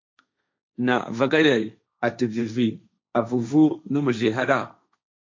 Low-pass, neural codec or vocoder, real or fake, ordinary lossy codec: 7.2 kHz; codec, 16 kHz, 1.1 kbps, Voila-Tokenizer; fake; MP3, 48 kbps